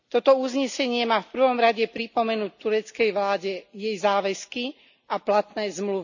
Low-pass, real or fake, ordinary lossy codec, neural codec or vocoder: 7.2 kHz; real; none; none